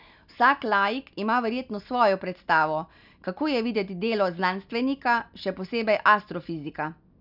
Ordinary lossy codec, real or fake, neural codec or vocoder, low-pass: none; real; none; 5.4 kHz